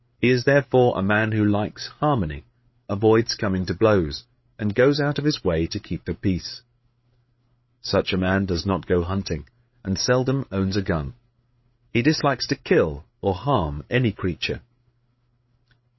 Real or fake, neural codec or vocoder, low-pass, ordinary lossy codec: fake; codec, 16 kHz, 8 kbps, FreqCodec, larger model; 7.2 kHz; MP3, 24 kbps